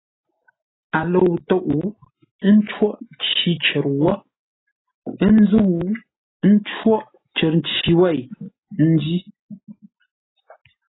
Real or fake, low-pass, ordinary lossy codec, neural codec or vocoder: real; 7.2 kHz; AAC, 16 kbps; none